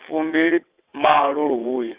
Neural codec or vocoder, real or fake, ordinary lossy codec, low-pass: vocoder, 22.05 kHz, 80 mel bands, WaveNeXt; fake; Opus, 64 kbps; 3.6 kHz